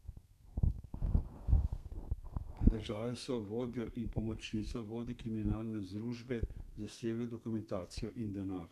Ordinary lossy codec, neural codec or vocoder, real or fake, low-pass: none; codec, 32 kHz, 1.9 kbps, SNAC; fake; 14.4 kHz